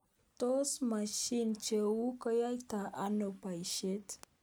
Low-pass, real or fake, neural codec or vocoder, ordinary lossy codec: none; real; none; none